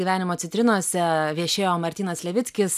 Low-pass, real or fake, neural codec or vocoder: 14.4 kHz; real; none